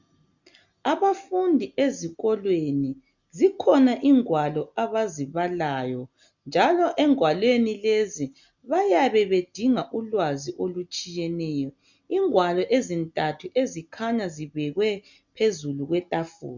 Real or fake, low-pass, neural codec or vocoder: real; 7.2 kHz; none